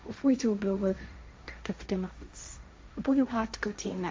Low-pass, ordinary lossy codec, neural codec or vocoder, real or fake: none; none; codec, 16 kHz, 1.1 kbps, Voila-Tokenizer; fake